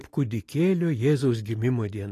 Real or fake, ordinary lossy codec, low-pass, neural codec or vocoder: real; AAC, 48 kbps; 14.4 kHz; none